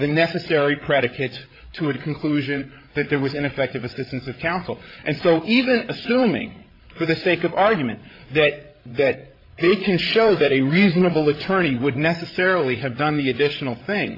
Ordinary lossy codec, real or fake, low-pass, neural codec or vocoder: AAC, 32 kbps; fake; 5.4 kHz; codec, 16 kHz, 8 kbps, FreqCodec, larger model